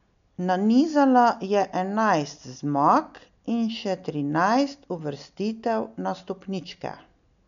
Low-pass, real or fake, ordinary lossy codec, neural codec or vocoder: 7.2 kHz; real; none; none